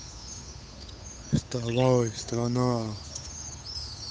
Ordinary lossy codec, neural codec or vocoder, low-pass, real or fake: none; codec, 16 kHz, 8 kbps, FunCodec, trained on Chinese and English, 25 frames a second; none; fake